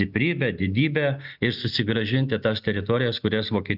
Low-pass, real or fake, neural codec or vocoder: 5.4 kHz; real; none